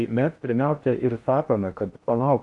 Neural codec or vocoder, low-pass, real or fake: codec, 16 kHz in and 24 kHz out, 0.8 kbps, FocalCodec, streaming, 65536 codes; 10.8 kHz; fake